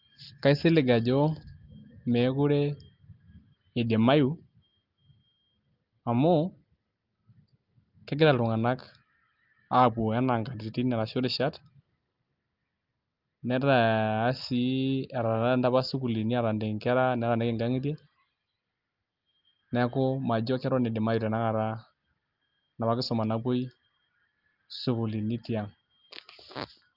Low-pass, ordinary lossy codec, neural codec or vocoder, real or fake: 5.4 kHz; Opus, 32 kbps; none; real